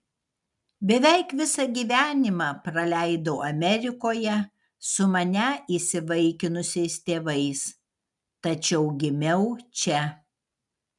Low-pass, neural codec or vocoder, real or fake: 10.8 kHz; none; real